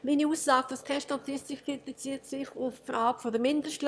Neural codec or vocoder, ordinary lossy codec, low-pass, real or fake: autoencoder, 22.05 kHz, a latent of 192 numbers a frame, VITS, trained on one speaker; none; none; fake